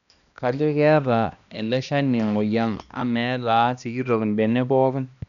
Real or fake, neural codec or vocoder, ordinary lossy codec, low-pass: fake; codec, 16 kHz, 1 kbps, X-Codec, HuBERT features, trained on balanced general audio; none; 7.2 kHz